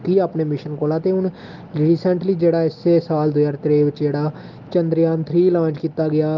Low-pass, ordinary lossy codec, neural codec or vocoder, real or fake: 7.2 kHz; Opus, 32 kbps; none; real